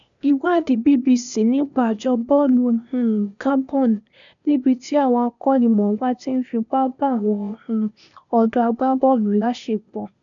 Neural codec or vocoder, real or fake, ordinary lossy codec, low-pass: codec, 16 kHz, 0.8 kbps, ZipCodec; fake; none; 7.2 kHz